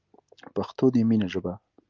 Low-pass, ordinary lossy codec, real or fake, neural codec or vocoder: 7.2 kHz; Opus, 24 kbps; real; none